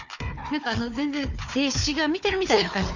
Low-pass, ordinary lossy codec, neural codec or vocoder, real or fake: 7.2 kHz; none; codec, 16 kHz, 4 kbps, FunCodec, trained on Chinese and English, 50 frames a second; fake